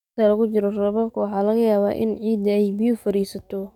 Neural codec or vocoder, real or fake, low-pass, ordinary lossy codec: codec, 44.1 kHz, 7.8 kbps, DAC; fake; 19.8 kHz; none